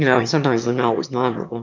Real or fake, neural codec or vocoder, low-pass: fake; autoencoder, 22.05 kHz, a latent of 192 numbers a frame, VITS, trained on one speaker; 7.2 kHz